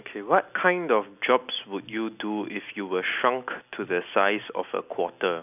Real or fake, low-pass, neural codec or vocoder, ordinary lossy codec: real; 3.6 kHz; none; none